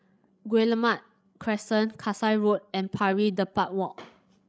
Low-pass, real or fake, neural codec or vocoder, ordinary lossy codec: none; fake; codec, 16 kHz, 16 kbps, FreqCodec, larger model; none